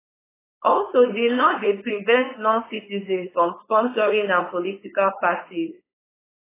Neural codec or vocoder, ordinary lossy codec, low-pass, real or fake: codec, 16 kHz, 4.8 kbps, FACodec; AAC, 16 kbps; 3.6 kHz; fake